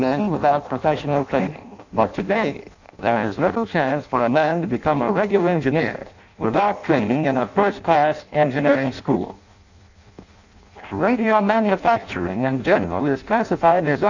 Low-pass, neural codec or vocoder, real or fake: 7.2 kHz; codec, 16 kHz in and 24 kHz out, 0.6 kbps, FireRedTTS-2 codec; fake